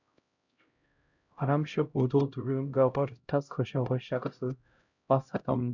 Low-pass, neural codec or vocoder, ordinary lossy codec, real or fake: 7.2 kHz; codec, 16 kHz, 0.5 kbps, X-Codec, HuBERT features, trained on LibriSpeech; none; fake